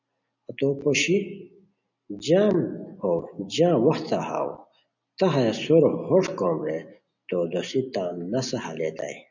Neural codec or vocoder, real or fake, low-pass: none; real; 7.2 kHz